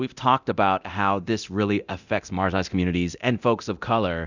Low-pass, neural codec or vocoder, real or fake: 7.2 kHz; codec, 24 kHz, 0.9 kbps, DualCodec; fake